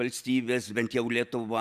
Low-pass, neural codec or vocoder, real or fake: 14.4 kHz; none; real